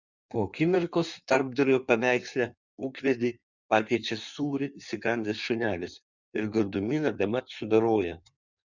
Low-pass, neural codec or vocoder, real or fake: 7.2 kHz; codec, 16 kHz in and 24 kHz out, 1.1 kbps, FireRedTTS-2 codec; fake